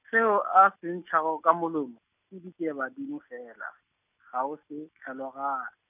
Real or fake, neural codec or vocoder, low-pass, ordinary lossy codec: real; none; 3.6 kHz; none